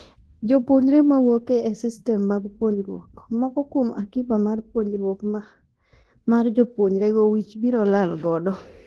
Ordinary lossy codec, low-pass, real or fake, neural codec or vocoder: Opus, 16 kbps; 10.8 kHz; fake; codec, 24 kHz, 0.9 kbps, DualCodec